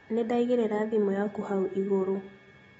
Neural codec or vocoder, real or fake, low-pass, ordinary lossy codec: none; real; 14.4 kHz; AAC, 24 kbps